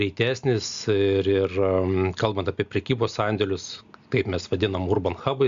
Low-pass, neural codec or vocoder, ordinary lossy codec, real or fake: 7.2 kHz; none; Opus, 64 kbps; real